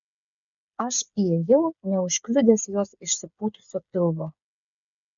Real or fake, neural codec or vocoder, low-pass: fake; codec, 16 kHz, 8 kbps, FreqCodec, smaller model; 7.2 kHz